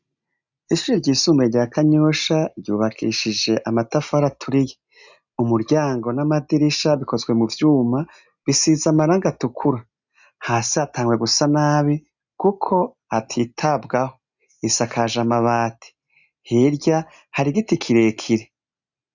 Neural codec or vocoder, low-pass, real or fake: none; 7.2 kHz; real